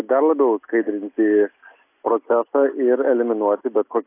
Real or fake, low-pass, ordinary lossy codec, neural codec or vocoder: real; 3.6 kHz; AAC, 24 kbps; none